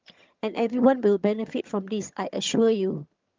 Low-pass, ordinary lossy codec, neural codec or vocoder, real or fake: 7.2 kHz; Opus, 24 kbps; vocoder, 22.05 kHz, 80 mel bands, HiFi-GAN; fake